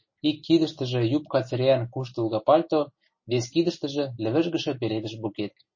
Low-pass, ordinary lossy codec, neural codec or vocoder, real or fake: 7.2 kHz; MP3, 32 kbps; none; real